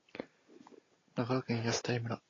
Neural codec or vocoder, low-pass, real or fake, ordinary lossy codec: none; 7.2 kHz; real; AAC, 32 kbps